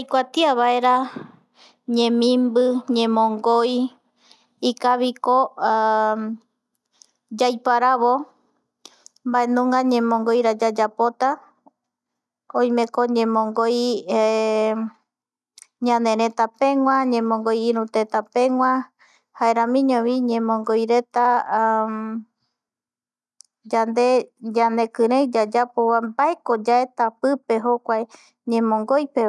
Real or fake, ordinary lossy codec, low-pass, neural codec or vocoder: real; none; none; none